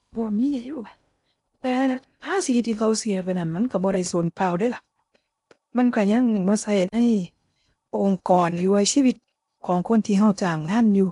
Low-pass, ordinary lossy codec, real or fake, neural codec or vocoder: 10.8 kHz; none; fake; codec, 16 kHz in and 24 kHz out, 0.6 kbps, FocalCodec, streaming, 2048 codes